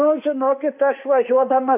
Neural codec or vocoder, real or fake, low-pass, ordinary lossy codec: autoencoder, 48 kHz, 32 numbers a frame, DAC-VAE, trained on Japanese speech; fake; 3.6 kHz; AAC, 32 kbps